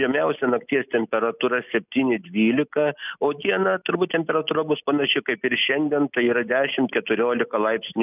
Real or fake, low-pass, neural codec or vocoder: real; 3.6 kHz; none